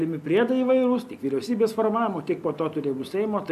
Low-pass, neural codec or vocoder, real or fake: 14.4 kHz; none; real